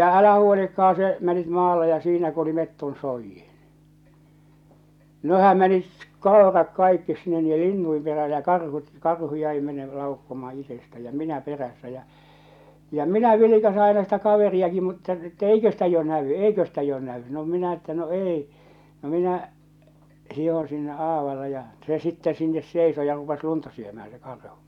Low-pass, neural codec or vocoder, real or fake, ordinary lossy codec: 19.8 kHz; none; real; none